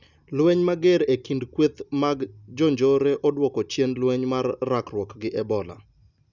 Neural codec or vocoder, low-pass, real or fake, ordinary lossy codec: none; 7.2 kHz; real; none